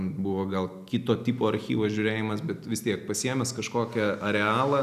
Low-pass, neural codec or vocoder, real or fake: 14.4 kHz; none; real